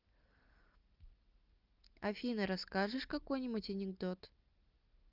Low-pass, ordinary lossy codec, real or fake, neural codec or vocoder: 5.4 kHz; Opus, 32 kbps; real; none